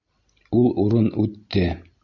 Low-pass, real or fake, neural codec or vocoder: 7.2 kHz; real; none